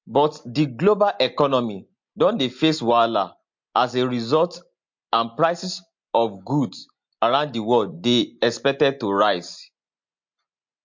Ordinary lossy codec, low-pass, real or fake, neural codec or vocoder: MP3, 48 kbps; 7.2 kHz; real; none